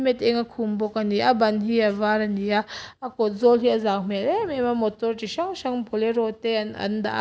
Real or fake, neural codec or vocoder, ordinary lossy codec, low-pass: real; none; none; none